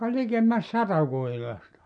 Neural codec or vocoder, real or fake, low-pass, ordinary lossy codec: none; real; 9.9 kHz; none